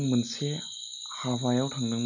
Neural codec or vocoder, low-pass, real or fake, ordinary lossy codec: none; 7.2 kHz; real; none